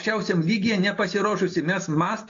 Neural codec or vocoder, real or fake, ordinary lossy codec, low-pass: none; real; AAC, 48 kbps; 7.2 kHz